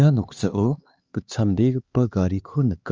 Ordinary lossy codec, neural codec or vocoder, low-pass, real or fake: Opus, 24 kbps; codec, 16 kHz, 2 kbps, X-Codec, HuBERT features, trained on LibriSpeech; 7.2 kHz; fake